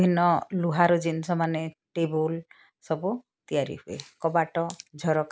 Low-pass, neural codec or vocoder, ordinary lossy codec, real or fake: none; none; none; real